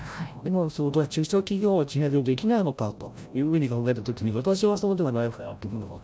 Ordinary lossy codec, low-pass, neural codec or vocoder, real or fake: none; none; codec, 16 kHz, 0.5 kbps, FreqCodec, larger model; fake